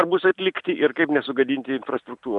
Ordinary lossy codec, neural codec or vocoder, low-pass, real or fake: MP3, 96 kbps; none; 10.8 kHz; real